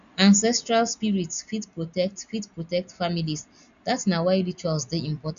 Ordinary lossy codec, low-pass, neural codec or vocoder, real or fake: none; 7.2 kHz; none; real